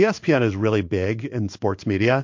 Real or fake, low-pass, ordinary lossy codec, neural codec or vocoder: fake; 7.2 kHz; MP3, 48 kbps; codec, 16 kHz in and 24 kHz out, 1 kbps, XY-Tokenizer